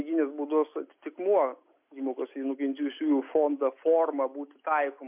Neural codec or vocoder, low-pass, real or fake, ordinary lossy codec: none; 3.6 kHz; real; MP3, 32 kbps